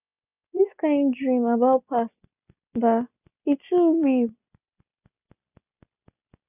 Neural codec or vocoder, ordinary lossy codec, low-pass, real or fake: none; none; 3.6 kHz; real